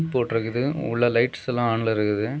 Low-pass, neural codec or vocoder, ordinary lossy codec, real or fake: none; none; none; real